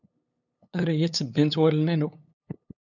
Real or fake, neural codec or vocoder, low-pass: fake; codec, 16 kHz, 8 kbps, FunCodec, trained on LibriTTS, 25 frames a second; 7.2 kHz